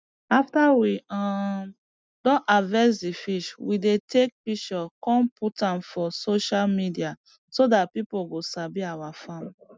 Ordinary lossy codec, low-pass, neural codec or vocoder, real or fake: none; none; none; real